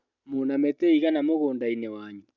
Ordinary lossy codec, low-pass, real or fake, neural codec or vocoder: none; 7.2 kHz; real; none